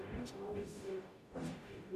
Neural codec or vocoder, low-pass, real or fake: codec, 44.1 kHz, 0.9 kbps, DAC; 14.4 kHz; fake